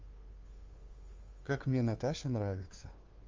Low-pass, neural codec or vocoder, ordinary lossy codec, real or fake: 7.2 kHz; codec, 16 kHz, 2 kbps, FunCodec, trained on Chinese and English, 25 frames a second; Opus, 32 kbps; fake